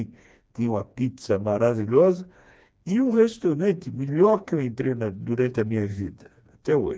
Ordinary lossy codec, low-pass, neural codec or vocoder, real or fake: none; none; codec, 16 kHz, 2 kbps, FreqCodec, smaller model; fake